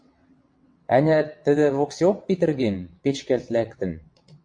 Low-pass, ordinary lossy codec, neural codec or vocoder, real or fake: 9.9 kHz; MP3, 48 kbps; vocoder, 22.05 kHz, 80 mel bands, WaveNeXt; fake